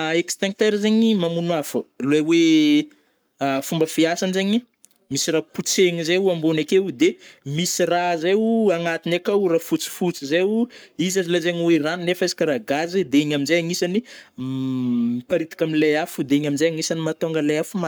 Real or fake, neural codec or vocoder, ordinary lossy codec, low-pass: fake; codec, 44.1 kHz, 7.8 kbps, Pupu-Codec; none; none